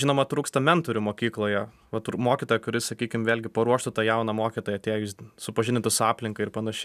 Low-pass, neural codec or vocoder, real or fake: 14.4 kHz; none; real